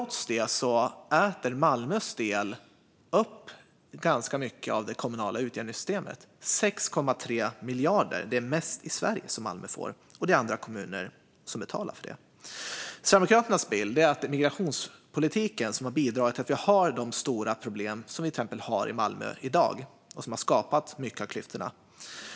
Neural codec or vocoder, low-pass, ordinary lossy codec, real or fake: none; none; none; real